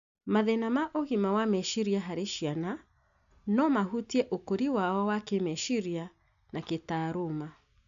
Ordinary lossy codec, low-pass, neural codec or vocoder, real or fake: none; 7.2 kHz; none; real